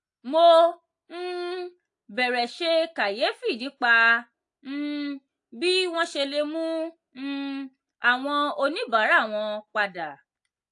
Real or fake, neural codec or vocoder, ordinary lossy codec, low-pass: real; none; AAC, 64 kbps; 10.8 kHz